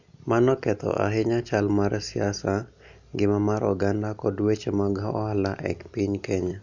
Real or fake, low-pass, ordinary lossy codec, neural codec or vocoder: real; 7.2 kHz; none; none